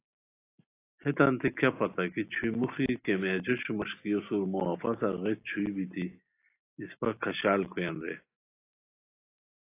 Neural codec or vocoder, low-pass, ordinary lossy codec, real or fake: none; 3.6 kHz; AAC, 24 kbps; real